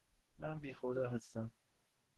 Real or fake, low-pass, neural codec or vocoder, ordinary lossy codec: fake; 14.4 kHz; codec, 44.1 kHz, 2.6 kbps, DAC; Opus, 16 kbps